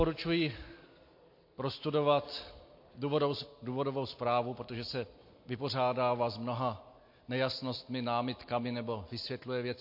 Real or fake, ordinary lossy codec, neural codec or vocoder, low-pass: real; MP3, 32 kbps; none; 5.4 kHz